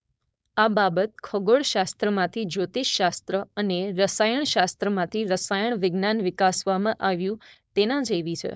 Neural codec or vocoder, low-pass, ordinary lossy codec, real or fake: codec, 16 kHz, 4.8 kbps, FACodec; none; none; fake